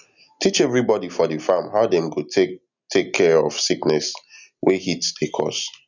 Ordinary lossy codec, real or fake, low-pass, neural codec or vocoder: none; real; 7.2 kHz; none